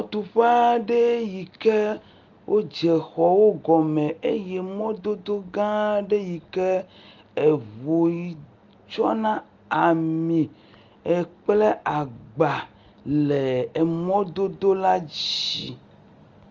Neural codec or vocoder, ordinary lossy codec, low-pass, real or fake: none; Opus, 32 kbps; 7.2 kHz; real